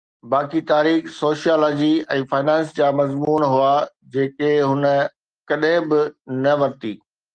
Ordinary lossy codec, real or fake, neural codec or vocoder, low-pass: Opus, 24 kbps; real; none; 9.9 kHz